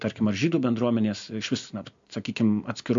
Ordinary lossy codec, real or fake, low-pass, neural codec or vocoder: AAC, 64 kbps; real; 7.2 kHz; none